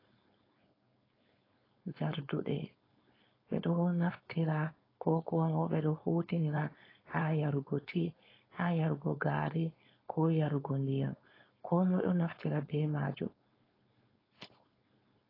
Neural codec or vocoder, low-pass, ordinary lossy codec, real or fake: codec, 16 kHz, 4.8 kbps, FACodec; 5.4 kHz; AAC, 24 kbps; fake